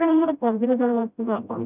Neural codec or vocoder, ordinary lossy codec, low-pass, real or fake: codec, 16 kHz, 0.5 kbps, FreqCodec, smaller model; none; 3.6 kHz; fake